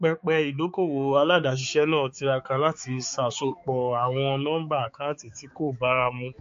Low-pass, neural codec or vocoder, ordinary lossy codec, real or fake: 7.2 kHz; codec, 16 kHz, 4 kbps, X-Codec, HuBERT features, trained on balanced general audio; MP3, 48 kbps; fake